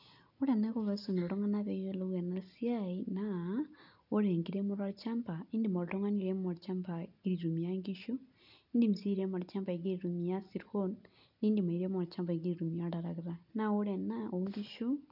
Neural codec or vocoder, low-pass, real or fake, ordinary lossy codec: none; 5.4 kHz; real; none